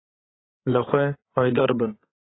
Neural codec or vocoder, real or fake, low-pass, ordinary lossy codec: codec, 16 kHz, 8 kbps, FreqCodec, larger model; fake; 7.2 kHz; AAC, 16 kbps